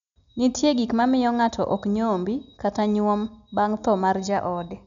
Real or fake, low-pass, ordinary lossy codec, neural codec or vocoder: real; 7.2 kHz; none; none